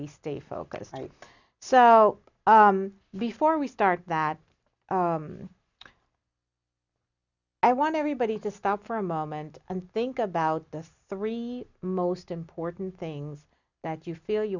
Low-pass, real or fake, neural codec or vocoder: 7.2 kHz; real; none